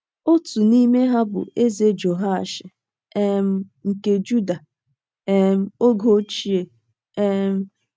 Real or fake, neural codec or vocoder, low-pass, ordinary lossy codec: real; none; none; none